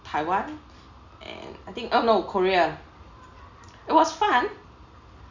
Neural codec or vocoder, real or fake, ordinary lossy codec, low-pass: none; real; Opus, 64 kbps; 7.2 kHz